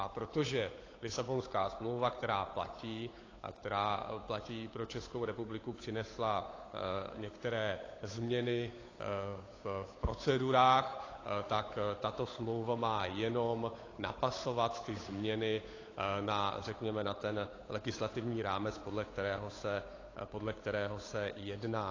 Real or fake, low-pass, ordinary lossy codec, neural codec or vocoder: fake; 7.2 kHz; AAC, 32 kbps; codec, 16 kHz, 8 kbps, FunCodec, trained on Chinese and English, 25 frames a second